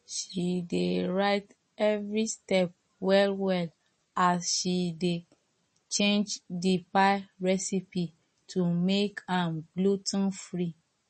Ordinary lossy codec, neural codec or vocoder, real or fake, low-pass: MP3, 32 kbps; none; real; 10.8 kHz